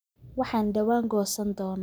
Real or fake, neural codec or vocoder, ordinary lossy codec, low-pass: real; none; none; none